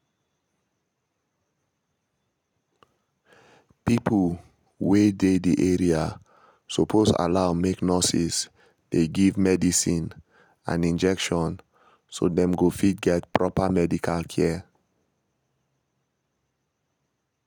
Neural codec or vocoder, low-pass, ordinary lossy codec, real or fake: none; 19.8 kHz; none; real